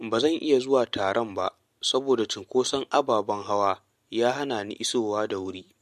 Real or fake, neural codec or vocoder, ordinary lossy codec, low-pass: real; none; MP3, 64 kbps; 14.4 kHz